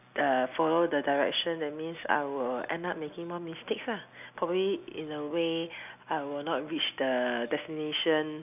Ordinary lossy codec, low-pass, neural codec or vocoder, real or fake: none; 3.6 kHz; none; real